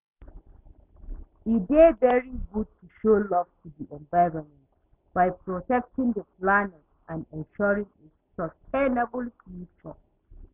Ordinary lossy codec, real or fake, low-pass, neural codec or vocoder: none; real; 3.6 kHz; none